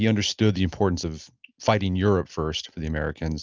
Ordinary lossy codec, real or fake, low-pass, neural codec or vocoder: Opus, 24 kbps; real; 7.2 kHz; none